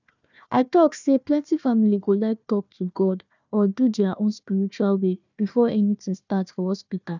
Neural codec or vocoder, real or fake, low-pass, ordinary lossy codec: codec, 16 kHz, 1 kbps, FunCodec, trained on Chinese and English, 50 frames a second; fake; 7.2 kHz; none